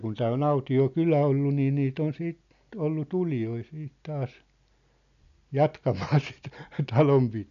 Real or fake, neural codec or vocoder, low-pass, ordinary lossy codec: real; none; 7.2 kHz; none